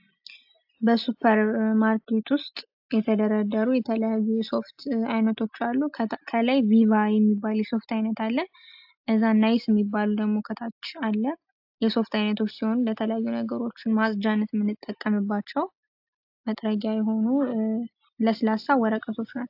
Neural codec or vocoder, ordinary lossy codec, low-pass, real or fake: none; AAC, 48 kbps; 5.4 kHz; real